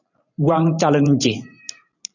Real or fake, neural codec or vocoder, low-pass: fake; vocoder, 44.1 kHz, 128 mel bands every 512 samples, BigVGAN v2; 7.2 kHz